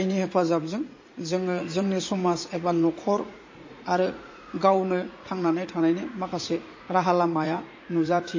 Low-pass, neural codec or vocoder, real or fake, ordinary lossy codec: 7.2 kHz; vocoder, 44.1 kHz, 80 mel bands, Vocos; fake; MP3, 32 kbps